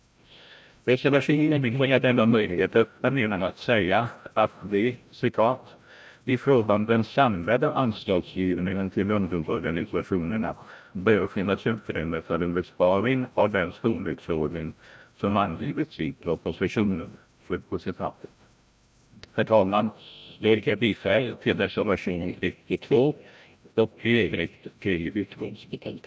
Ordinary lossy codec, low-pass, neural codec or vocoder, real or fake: none; none; codec, 16 kHz, 0.5 kbps, FreqCodec, larger model; fake